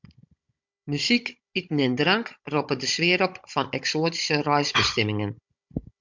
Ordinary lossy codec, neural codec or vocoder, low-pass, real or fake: MP3, 64 kbps; codec, 16 kHz, 16 kbps, FunCodec, trained on Chinese and English, 50 frames a second; 7.2 kHz; fake